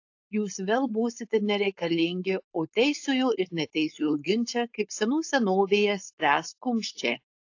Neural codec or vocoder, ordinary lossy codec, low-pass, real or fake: codec, 16 kHz, 4.8 kbps, FACodec; AAC, 48 kbps; 7.2 kHz; fake